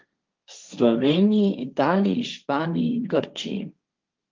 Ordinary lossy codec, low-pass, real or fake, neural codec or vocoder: Opus, 24 kbps; 7.2 kHz; fake; codec, 16 kHz, 1.1 kbps, Voila-Tokenizer